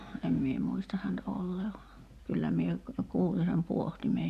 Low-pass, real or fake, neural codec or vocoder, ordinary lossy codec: 14.4 kHz; real; none; none